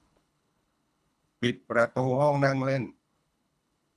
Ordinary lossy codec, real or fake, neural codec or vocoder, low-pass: none; fake; codec, 24 kHz, 3 kbps, HILCodec; none